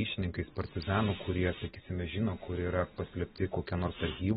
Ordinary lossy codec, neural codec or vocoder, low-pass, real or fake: AAC, 16 kbps; none; 9.9 kHz; real